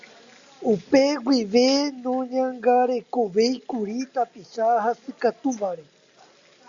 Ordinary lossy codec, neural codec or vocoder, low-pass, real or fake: Opus, 64 kbps; none; 7.2 kHz; real